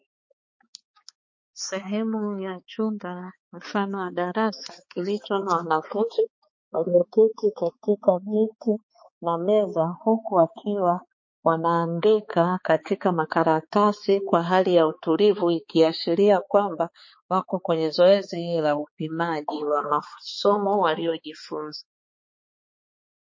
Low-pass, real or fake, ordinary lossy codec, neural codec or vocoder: 7.2 kHz; fake; MP3, 32 kbps; codec, 16 kHz, 4 kbps, X-Codec, HuBERT features, trained on balanced general audio